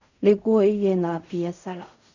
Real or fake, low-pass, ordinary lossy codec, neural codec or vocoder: fake; 7.2 kHz; none; codec, 16 kHz in and 24 kHz out, 0.4 kbps, LongCat-Audio-Codec, fine tuned four codebook decoder